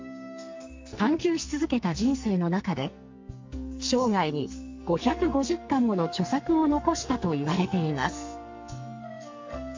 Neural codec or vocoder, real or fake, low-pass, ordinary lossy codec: codec, 44.1 kHz, 2.6 kbps, SNAC; fake; 7.2 kHz; MP3, 48 kbps